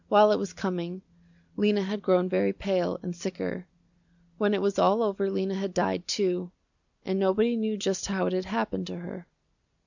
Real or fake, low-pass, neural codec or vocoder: real; 7.2 kHz; none